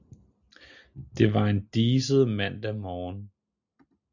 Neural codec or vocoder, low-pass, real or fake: none; 7.2 kHz; real